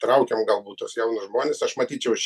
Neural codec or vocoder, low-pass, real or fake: none; 14.4 kHz; real